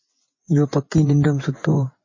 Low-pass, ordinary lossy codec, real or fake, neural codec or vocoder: 7.2 kHz; MP3, 32 kbps; fake; vocoder, 24 kHz, 100 mel bands, Vocos